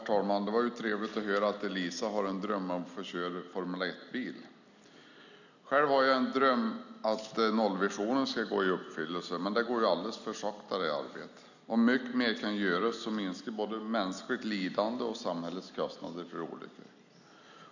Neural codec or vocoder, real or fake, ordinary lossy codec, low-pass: none; real; none; 7.2 kHz